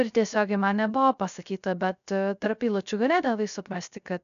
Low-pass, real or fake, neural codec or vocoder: 7.2 kHz; fake; codec, 16 kHz, 0.3 kbps, FocalCodec